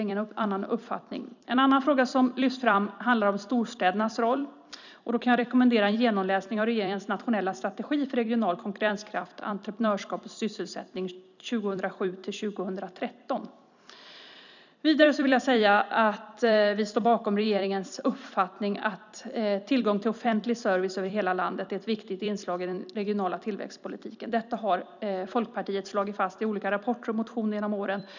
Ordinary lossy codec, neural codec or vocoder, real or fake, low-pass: none; vocoder, 44.1 kHz, 128 mel bands every 256 samples, BigVGAN v2; fake; 7.2 kHz